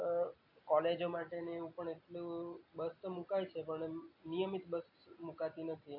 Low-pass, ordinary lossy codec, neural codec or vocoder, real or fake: 5.4 kHz; AAC, 32 kbps; none; real